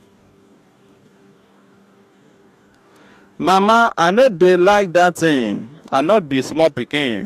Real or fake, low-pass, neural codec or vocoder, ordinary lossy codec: fake; 14.4 kHz; codec, 44.1 kHz, 2.6 kbps, DAC; AAC, 96 kbps